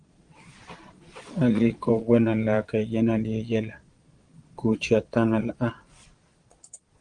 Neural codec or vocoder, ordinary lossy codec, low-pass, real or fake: vocoder, 22.05 kHz, 80 mel bands, Vocos; Opus, 24 kbps; 9.9 kHz; fake